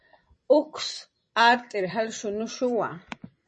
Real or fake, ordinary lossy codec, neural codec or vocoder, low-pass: fake; MP3, 32 kbps; vocoder, 44.1 kHz, 128 mel bands every 256 samples, BigVGAN v2; 10.8 kHz